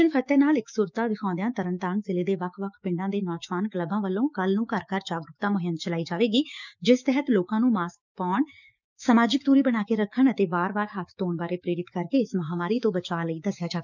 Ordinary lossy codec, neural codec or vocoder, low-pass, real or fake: none; codec, 16 kHz, 6 kbps, DAC; 7.2 kHz; fake